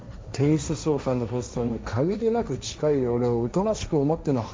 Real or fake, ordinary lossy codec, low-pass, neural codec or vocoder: fake; none; none; codec, 16 kHz, 1.1 kbps, Voila-Tokenizer